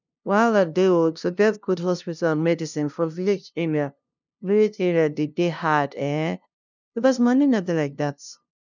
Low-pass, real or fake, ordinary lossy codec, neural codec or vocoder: 7.2 kHz; fake; none; codec, 16 kHz, 0.5 kbps, FunCodec, trained on LibriTTS, 25 frames a second